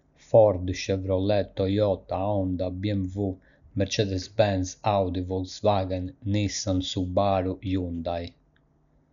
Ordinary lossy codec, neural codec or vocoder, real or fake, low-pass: none; none; real; 7.2 kHz